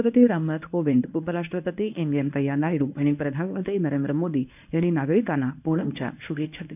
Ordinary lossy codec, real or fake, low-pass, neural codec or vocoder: none; fake; 3.6 kHz; codec, 24 kHz, 0.9 kbps, WavTokenizer, medium speech release version 1